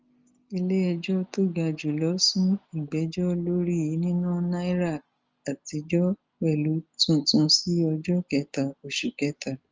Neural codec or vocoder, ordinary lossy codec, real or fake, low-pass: none; Opus, 24 kbps; real; 7.2 kHz